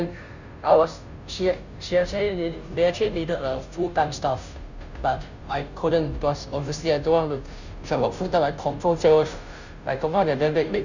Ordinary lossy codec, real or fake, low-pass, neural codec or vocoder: none; fake; 7.2 kHz; codec, 16 kHz, 0.5 kbps, FunCodec, trained on Chinese and English, 25 frames a second